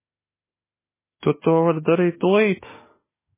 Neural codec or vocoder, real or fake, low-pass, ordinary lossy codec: autoencoder, 48 kHz, 32 numbers a frame, DAC-VAE, trained on Japanese speech; fake; 3.6 kHz; MP3, 16 kbps